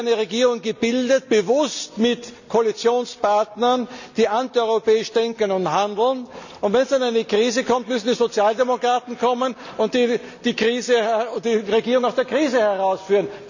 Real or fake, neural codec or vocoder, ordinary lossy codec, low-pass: real; none; none; 7.2 kHz